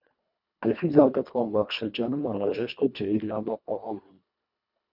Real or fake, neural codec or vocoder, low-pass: fake; codec, 24 kHz, 1.5 kbps, HILCodec; 5.4 kHz